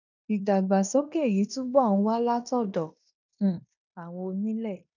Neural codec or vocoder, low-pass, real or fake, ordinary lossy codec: codec, 16 kHz in and 24 kHz out, 0.9 kbps, LongCat-Audio-Codec, fine tuned four codebook decoder; 7.2 kHz; fake; none